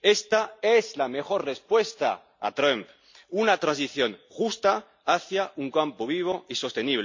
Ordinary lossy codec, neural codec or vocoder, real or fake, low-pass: MP3, 48 kbps; none; real; 7.2 kHz